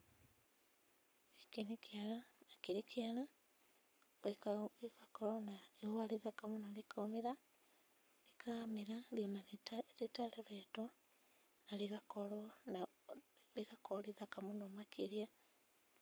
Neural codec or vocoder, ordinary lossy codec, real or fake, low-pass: codec, 44.1 kHz, 7.8 kbps, Pupu-Codec; none; fake; none